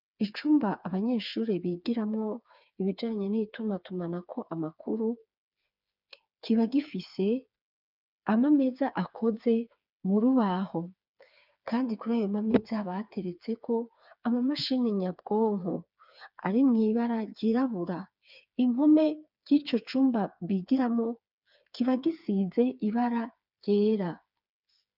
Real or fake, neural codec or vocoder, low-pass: fake; codec, 16 kHz, 4 kbps, FreqCodec, smaller model; 5.4 kHz